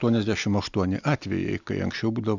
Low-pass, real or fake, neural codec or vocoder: 7.2 kHz; real; none